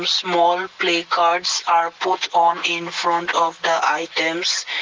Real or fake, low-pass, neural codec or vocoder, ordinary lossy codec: real; 7.2 kHz; none; Opus, 24 kbps